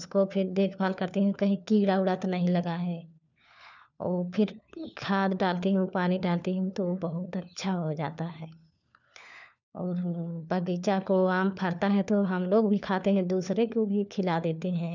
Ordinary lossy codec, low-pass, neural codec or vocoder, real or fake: none; 7.2 kHz; codec, 16 kHz, 4 kbps, FunCodec, trained on LibriTTS, 50 frames a second; fake